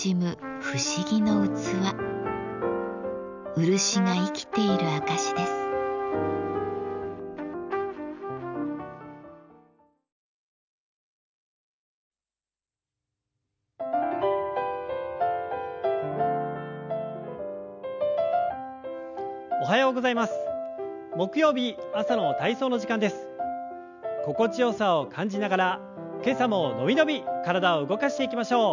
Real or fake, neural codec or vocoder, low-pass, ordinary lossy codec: real; none; 7.2 kHz; none